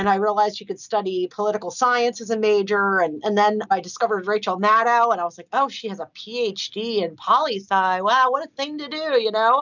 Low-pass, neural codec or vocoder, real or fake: 7.2 kHz; none; real